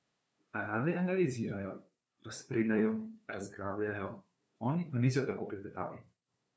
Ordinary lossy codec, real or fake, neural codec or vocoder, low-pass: none; fake; codec, 16 kHz, 2 kbps, FunCodec, trained on LibriTTS, 25 frames a second; none